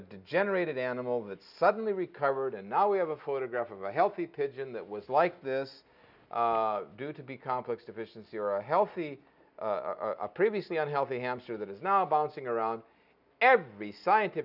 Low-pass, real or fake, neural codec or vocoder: 5.4 kHz; real; none